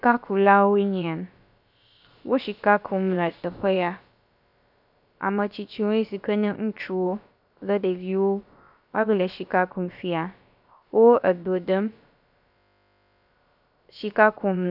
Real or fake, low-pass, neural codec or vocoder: fake; 5.4 kHz; codec, 16 kHz, about 1 kbps, DyCAST, with the encoder's durations